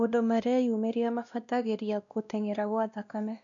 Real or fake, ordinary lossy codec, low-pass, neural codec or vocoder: fake; none; 7.2 kHz; codec, 16 kHz, 1 kbps, X-Codec, WavLM features, trained on Multilingual LibriSpeech